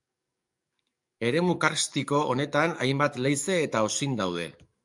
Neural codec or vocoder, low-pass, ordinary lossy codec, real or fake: codec, 44.1 kHz, 7.8 kbps, DAC; 10.8 kHz; MP3, 96 kbps; fake